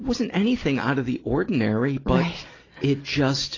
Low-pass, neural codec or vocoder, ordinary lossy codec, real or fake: 7.2 kHz; none; AAC, 32 kbps; real